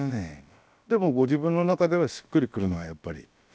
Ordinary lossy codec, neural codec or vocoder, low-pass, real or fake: none; codec, 16 kHz, about 1 kbps, DyCAST, with the encoder's durations; none; fake